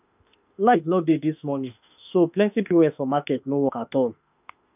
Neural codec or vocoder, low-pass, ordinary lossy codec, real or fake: autoencoder, 48 kHz, 32 numbers a frame, DAC-VAE, trained on Japanese speech; 3.6 kHz; none; fake